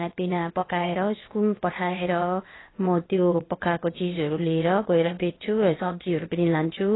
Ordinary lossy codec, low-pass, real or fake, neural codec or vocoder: AAC, 16 kbps; 7.2 kHz; fake; codec, 16 kHz, 0.8 kbps, ZipCodec